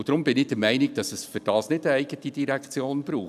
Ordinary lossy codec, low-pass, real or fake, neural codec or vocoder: none; 14.4 kHz; real; none